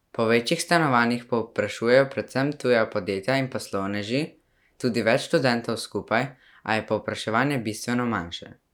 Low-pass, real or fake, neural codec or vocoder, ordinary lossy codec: 19.8 kHz; real; none; none